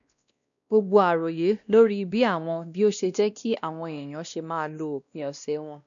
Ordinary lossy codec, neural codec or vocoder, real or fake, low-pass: MP3, 96 kbps; codec, 16 kHz, 1 kbps, X-Codec, WavLM features, trained on Multilingual LibriSpeech; fake; 7.2 kHz